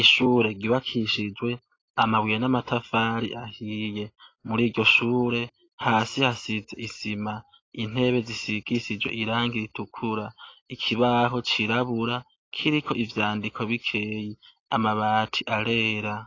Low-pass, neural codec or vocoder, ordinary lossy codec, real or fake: 7.2 kHz; none; AAC, 32 kbps; real